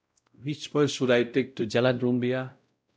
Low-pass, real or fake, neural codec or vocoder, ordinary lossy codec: none; fake; codec, 16 kHz, 0.5 kbps, X-Codec, WavLM features, trained on Multilingual LibriSpeech; none